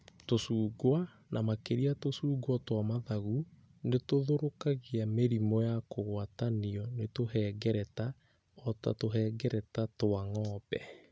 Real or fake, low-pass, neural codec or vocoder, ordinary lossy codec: real; none; none; none